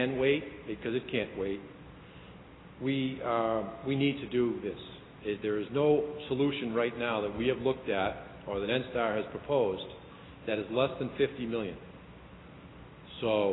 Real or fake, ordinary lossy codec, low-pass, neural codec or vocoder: real; AAC, 16 kbps; 7.2 kHz; none